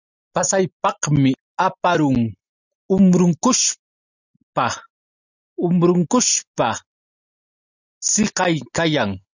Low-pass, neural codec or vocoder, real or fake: 7.2 kHz; none; real